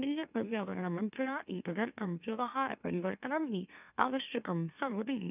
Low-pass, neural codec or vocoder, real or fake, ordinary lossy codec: 3.6 kHz; autoencoder, 44.1 kHz, a latent of 192 numbers a frame, MeloTTS; fake; none